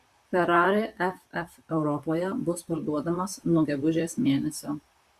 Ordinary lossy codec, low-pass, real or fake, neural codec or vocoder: Opus, 64 kbps; 14.4 kHz; fake; vocoder, 44.1 kHz, 128 mel bands, Pupu-Vocoder